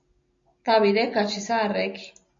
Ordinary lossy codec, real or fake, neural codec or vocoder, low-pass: MP3, 48 kbps; real; none; 7.2 kHz